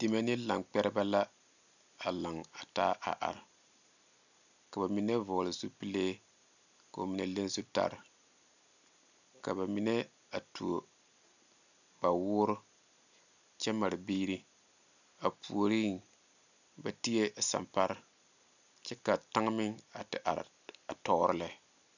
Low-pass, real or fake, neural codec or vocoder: 7.2 kHz; real; none